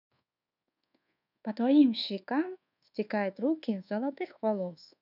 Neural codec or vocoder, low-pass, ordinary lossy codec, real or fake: codec, 24 kHz, 1.2 kbps, DualCodec; 5.4 kHz; none; fake